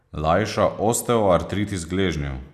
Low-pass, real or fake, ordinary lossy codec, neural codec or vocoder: 14.4 kHz; real; none; none